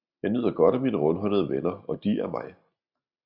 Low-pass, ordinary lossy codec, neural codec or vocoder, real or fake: 5.4 kHz; Opus, 64 kbps; none; real